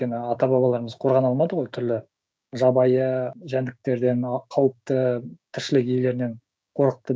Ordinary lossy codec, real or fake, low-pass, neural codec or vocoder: none; fake; none; codec, 16 kHz, 6 kbps, DAC